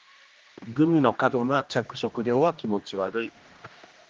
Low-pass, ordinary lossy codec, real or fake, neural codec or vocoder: 7.2 kHz; Opus, 32 kbps; fake; codec, 16 kHz, 1 kbps, X-Codec, HuBERT features, trained on general audio